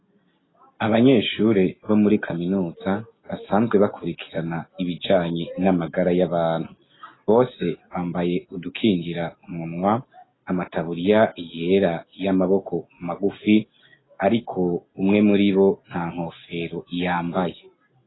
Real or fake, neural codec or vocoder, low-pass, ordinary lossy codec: real; none; 7.2 kHz; AAC, 16 kbps